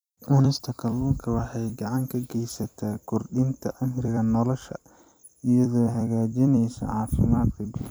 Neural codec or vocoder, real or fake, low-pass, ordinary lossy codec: vocoder, 44.1 kHz, 128 mel bands every 256 samples, BigVGAN v2; fake; none; none